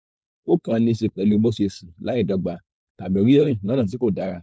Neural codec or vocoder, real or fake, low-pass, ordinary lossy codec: codec, 16 kHz, 4.8 kbps, FACodec; fake; none; none